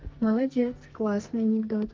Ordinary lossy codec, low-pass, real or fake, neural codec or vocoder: Opus, 32 kbps; 7.2 kHz; fake; codec, 44.1 kHz, 2.6 kbps, SNAC